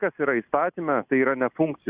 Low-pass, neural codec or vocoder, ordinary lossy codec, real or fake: 3.6 kHz; none; Opus, 64 kbps; real